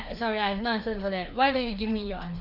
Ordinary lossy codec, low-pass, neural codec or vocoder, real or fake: none; 5.4 kHz; codec, 16 kHz, 2 kbps, FreqCodec, larger model; fake